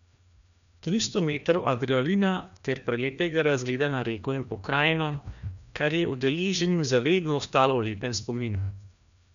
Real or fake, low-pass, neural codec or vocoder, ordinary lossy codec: fake; 7.2 kHz; codec, 16 kHz, 1 kbps, FreqCodec, larger model; none